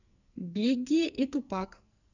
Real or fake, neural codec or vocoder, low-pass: fake; codec, 44.1 kHz, 2.6 kbps, SNAC; 7.2 kHz